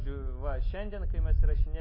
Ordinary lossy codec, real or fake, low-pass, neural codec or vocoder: MP3, 24 kbps; real; 5.4 kHz; none